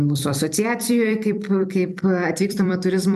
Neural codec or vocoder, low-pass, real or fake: vocoder, 44.1 kHz, 128 mel bands every 256 samples, BigVGAN v2; 14.4 kHz; fake